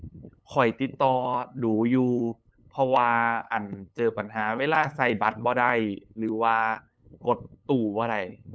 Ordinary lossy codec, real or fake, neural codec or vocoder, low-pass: none; fake; codec, 16 kHz, 8 kbps, FunCodec, trained on LibriTTS, 25 frames a second; none